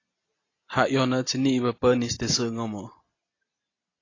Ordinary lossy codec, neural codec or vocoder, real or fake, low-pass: AAC, 32 kbps; none; real; 7.2 kHz